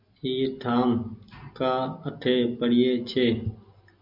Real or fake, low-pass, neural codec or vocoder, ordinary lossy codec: real; 5.4 kHz; none; MP3, 48 kbps